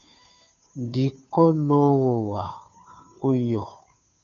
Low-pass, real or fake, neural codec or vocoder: 7.2 kHz; fake; codec, 16 kHz, 2 kbps, FunCodec, trained on Chinese and English, 25 frames a second